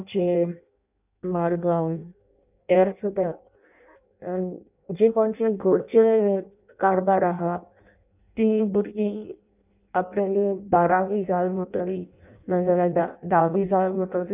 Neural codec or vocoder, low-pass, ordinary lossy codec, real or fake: codec, 16 kHz in and 24 kHz out, 0.6 kbps, FireRedTTS-2 codec; 3.6 kHz; none; fake